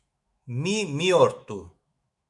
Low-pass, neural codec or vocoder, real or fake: 10.8 kHz; autoencoder, 48 kHz, 128 numbers a frame, DAC-VAE, trained on Japanese speech; fake